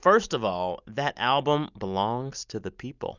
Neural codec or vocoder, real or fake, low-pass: none; real; 7.2 kHz